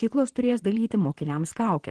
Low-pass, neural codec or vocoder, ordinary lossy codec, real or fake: 10.8 kHz; vocoder, 44.1 kHz, 128 mel bands, Pupu-Vocoder; Opus, 16 kbps; fake